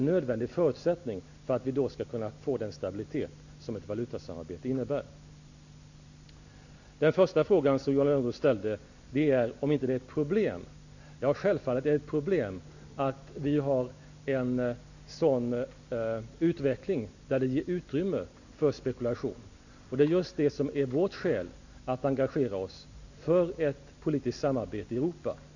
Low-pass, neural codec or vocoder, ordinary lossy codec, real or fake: 7.2 kHz; none; none; real